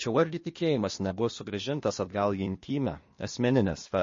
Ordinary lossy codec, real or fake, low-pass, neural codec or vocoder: MP3, 32 kbps; fake; 7.2 kHz; codec, 16 kHz, 0.8 kbps, ZipCodec